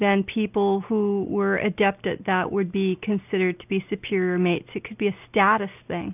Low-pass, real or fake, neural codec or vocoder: 3.6 kHz; real; none